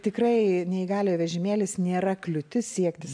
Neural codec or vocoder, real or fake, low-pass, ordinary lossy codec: none; real; 9.9 kHz; AAC, 64 kbps